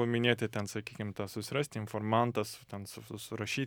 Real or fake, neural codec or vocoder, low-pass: real; none; 19.8 kHz